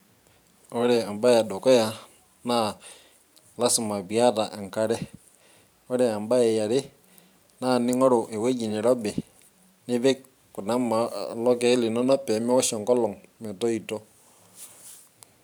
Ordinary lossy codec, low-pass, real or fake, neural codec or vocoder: none; none; fake; vocoder, 44.1 kHz, 128 mel bands every 512 samples, BigVGAN v2